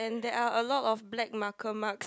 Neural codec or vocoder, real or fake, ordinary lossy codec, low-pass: none; real; none; none